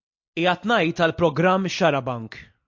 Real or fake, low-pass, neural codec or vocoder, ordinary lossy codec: real; 7.2 kHz; none; MP3, 48 kbps